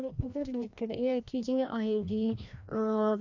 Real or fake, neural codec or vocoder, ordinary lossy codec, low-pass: fake; codec, 16 kHz, 1 kbps, FreqCodec, larger model; none; 7.2 kHz